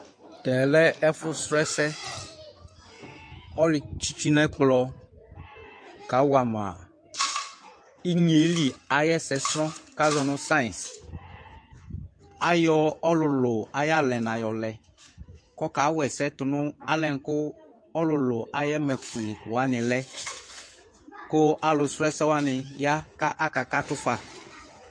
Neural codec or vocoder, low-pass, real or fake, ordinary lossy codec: codec, 16 kHz in and 24 kHz out, 2.2 kbps, FireRedTTS-2 codec; 9.9 kHz; fake; MP3, 48 kbps